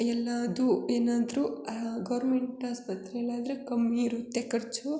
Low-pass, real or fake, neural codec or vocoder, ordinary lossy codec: none; real; none; none